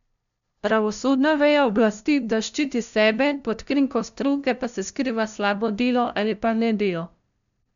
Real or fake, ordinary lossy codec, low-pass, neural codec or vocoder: fake; none; 7.2 kHz; codec, 16 kHz, 0.5 kbps, FunCodec, trained on LibriTTS, 25 frames a second